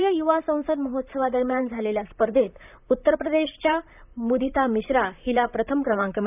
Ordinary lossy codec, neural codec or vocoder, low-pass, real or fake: none; vocoder, 44.1 kHz, 128 mel bands, Pupu-Vocoder; 3.6 kHz; fake